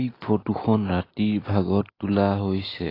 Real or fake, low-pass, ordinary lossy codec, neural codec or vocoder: real; 5.4 kHz; AAC, 24 kbps; none